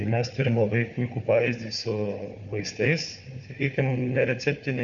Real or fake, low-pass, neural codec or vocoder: fake; 7.2 kHz; codec, 16 kHz, 4 kbps, FunCodec, trained on Chinese and English, 50 frames a second